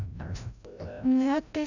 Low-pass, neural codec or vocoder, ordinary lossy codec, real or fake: 7.2 kHz; codec, 16 kHz, 0.5 kbps, FreqCodec, larger model; none; fake